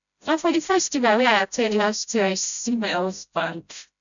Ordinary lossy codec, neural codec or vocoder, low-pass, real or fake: none; codec, 16 kHz, 0.5 kbps, FreqCodec, smaller model; 7.2 kHz; fake